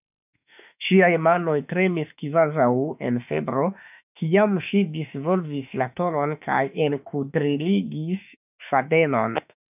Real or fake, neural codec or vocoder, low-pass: fake; autoencoder, 48 kHz, 32 numbers a frame, DAC-VAE, trained on Japanese speech; 3.6 kHz